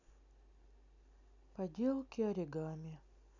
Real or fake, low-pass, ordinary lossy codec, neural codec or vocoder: real; 7.2 kHz; none; none